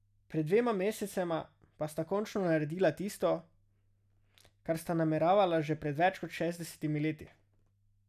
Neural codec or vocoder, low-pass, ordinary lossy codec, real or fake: none; 14.4 kHz; none; real